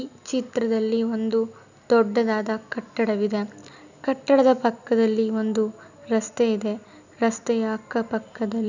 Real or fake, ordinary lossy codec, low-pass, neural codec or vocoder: real; none; 7.2 kHz; none